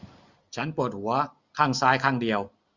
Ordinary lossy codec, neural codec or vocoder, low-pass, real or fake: Opus, 64 kbps; none; 7.2 kHz; real